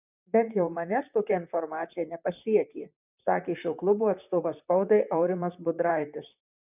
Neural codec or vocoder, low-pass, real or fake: vocoder, 44.1 kHz, 128 mel bands, Pupu-Vocoder; 3.6 kHz; fake